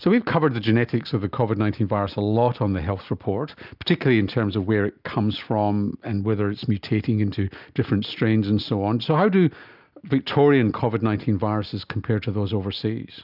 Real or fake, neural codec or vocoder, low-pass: real; none; 5.4 kHz